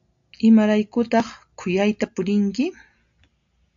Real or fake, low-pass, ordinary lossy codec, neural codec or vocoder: real; 7.2 kHz; AAC, 64 kbps; none